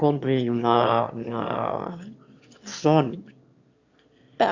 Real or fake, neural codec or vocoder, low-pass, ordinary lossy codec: fake; autoencoder, 22.05 kHz, a latent of 192 numbers a frame, VITS, trained on one speaker; 7.2 kHz; Opus, 64 kbps